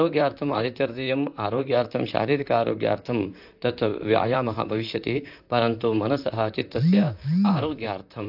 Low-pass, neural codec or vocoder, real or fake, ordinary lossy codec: 5.4 kHz; vocoder, 44.1 kHz, 128 mel bands, Pupu-Vocoder; fake; AAC, 48 kbps